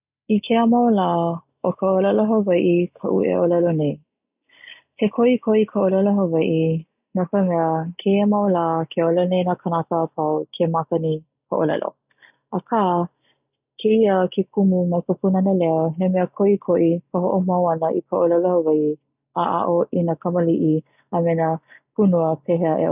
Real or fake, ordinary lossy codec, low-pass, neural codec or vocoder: real; none; 3.6 kHz; none